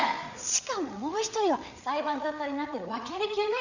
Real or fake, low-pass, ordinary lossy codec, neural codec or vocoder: fake; 7.2 kHz; none; codec, 16 kHz, 8 kbps, FreqCodec, larger model